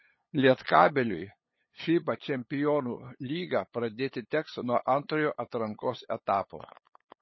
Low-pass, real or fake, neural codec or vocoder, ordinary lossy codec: 7.2 kHz; fake; codec, 16 kHz, 8 kbps, FunCodec, trained on LibriTTS, 25 frames a second; MP3, 24 kbps